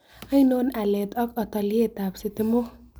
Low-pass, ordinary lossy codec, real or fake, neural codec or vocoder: none; none; real; none